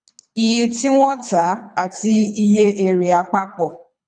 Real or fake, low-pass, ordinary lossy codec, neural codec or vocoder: fake; 9.9 kHz; none; codec, 24 kHz, 3 kbps, HILCodec